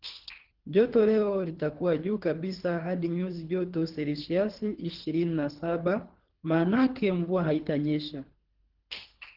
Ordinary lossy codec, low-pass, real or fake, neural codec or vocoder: Opus, 16 kbps; 5.4 kHz; fake; codec, 24 kHz, 3 kbps, HILCodec